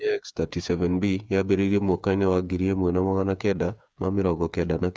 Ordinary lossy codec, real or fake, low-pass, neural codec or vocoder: none; fake; none; codec, 16 kHz, 8 kbps, FreqCodec, smaller model